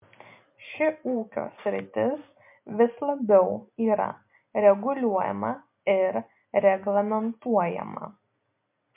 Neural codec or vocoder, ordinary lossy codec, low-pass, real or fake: none; MP3, 32 kbps; 3.6 kHz; real